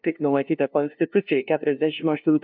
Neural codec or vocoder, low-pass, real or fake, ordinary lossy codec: codec, 16 kHz, 0.5 kbps, FunCodec, trained on LibriTTS, 25 frames a second; 5.4 kHz; fake; MP3, 48 kbps